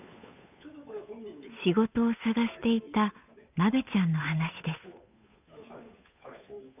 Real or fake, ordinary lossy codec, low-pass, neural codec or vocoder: fake; none; 3.6 kHz; codec, 16 kHz, 8 kbps, FunCodec, trained on Chinese and English, 25 frames a second